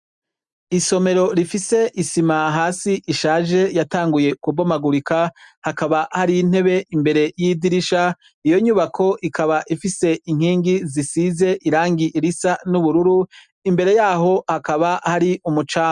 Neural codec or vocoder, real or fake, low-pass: none; real; 10.8 kHz